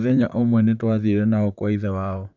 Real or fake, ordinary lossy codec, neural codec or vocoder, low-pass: fake; none; vocoder, 44.1 kHz, 128 mel bands, Pupu-Vocoder; 7.2 kHz